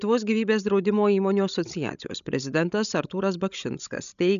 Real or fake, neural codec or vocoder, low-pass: fake; codec, 16 kHz, 16 kbps, FreqCodec, larger model; 7.2 kHz